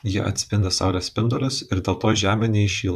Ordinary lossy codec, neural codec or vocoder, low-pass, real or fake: AAC, 96 kbps; vocoder, 44.1 kHz, 128 mel bands, Pupu-Vocoder; 14.4 kHz; fake